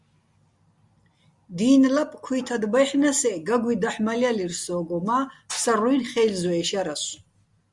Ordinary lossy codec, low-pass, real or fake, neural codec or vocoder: Opus, 64 kbps; 10.8 kHz; real; none